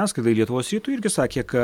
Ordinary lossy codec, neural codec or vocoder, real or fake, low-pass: MP3, 96 kbps; none; real; 19.8 kHz